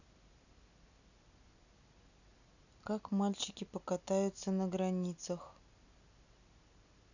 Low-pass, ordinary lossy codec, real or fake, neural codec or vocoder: 7.2 kHz; none; real; none